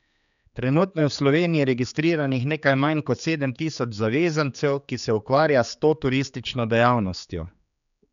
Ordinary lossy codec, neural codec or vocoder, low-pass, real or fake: none; codec, 16 kHz, 4 kbps, X-Codec, HuBERT features, trained on general audio; 7.2 kHz; fake